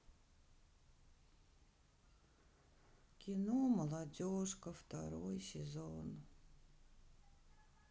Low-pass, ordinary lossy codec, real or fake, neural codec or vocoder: none; none; real; none